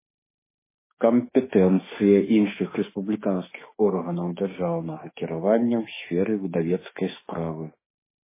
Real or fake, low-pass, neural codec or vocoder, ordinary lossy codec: fake; 3.6 kHz; autoencoder, 48 kHz, 32 numbers a frame, DAC-VAE, trained on Japanese speech; MP3, 16 kbps